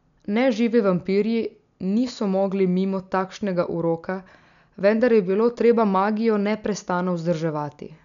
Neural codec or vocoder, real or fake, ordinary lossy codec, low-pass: none; real; none; 7.2 kHz